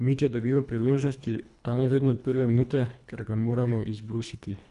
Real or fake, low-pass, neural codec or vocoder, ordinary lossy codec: fake; 10.8 kHz; codec, 24 kHz, 1.5 kbps, HILCodec; none